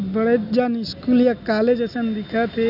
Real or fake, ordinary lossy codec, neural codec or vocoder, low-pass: real; none; none; 5.4 kHz